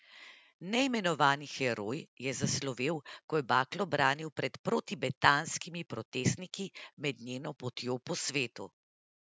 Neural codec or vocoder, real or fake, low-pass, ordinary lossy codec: none; real; none; none